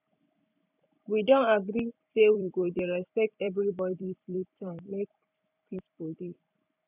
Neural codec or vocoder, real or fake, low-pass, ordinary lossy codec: vocoder, 44.1 kHz, 128 mel bands every 256 samples, BigVGAN v2; fake; 3.6 kHz; none